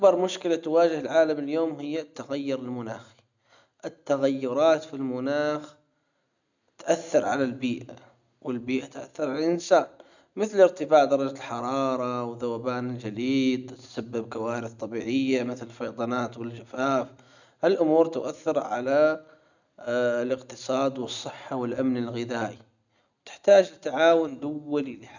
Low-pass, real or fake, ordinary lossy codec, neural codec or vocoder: 7.2 kHz; real; none; none